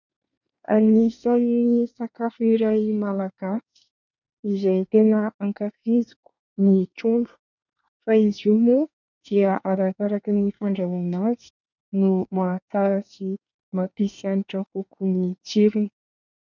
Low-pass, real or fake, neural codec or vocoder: 7.2 kHz; fake; codec, 24 kHz, 1 kbps, SNAC